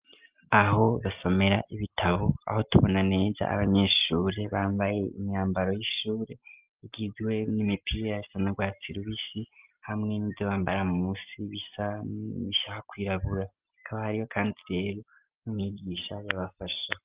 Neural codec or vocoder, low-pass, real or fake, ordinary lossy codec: none; 3.6 kHz; real; Opus, 24 kbps